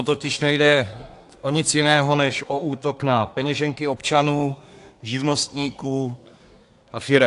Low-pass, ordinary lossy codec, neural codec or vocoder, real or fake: 10.8 kHz; AAC, 64 kbps; codec, 24 kHz, 1 kbps, SNAC; fake